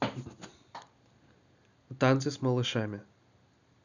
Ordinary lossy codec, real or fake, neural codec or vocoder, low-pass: none; real; none; 7.2 kHz